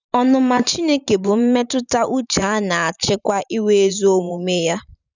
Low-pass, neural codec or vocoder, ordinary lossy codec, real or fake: 7.2 kHz; vocoder, 44.1 kHz, 128 mel bands every 512 samples, BigVGAN v2; none; fake